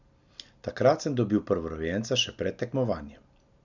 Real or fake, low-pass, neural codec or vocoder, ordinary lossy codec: real; 7.2 kHz; none; none